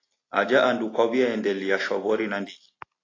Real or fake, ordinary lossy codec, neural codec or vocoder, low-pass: real; AAC, 32 kbps; none; 7.2 kHz